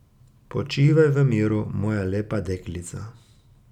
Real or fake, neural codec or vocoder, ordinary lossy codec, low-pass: real; none; none; 19.8 kHz